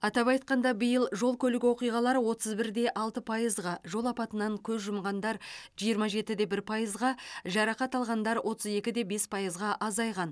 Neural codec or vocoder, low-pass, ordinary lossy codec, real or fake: none; none; none; real